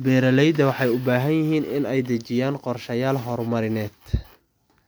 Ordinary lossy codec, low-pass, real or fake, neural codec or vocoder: none; none; real; none